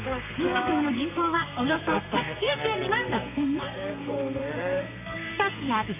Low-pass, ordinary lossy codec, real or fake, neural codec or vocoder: 3.6 kHz; none; fake; codec, 32 kHz, 1.9 kbps, SNAC